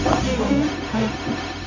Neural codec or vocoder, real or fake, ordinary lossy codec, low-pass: codec, 16 kHz, 0.4 kbps, LongCat-Audio-Codec; fake; none; 7.2 kHz